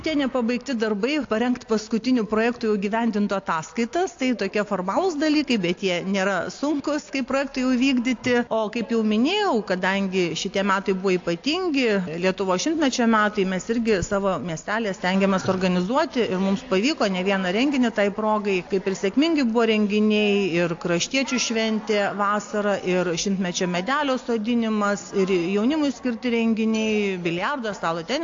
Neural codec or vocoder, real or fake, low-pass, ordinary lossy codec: none; real; 7.2 kHz; AAC, 48 kbps